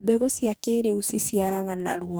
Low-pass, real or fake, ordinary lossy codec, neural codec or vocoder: none; fake; none; codec, 44.1 kHz, 2.6 kbps, DAC